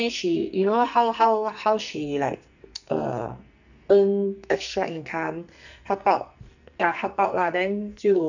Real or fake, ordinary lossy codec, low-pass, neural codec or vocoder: fake; none; 7.2 kHz; codec, 44.1 kHz, 2.6 kbps, SNAC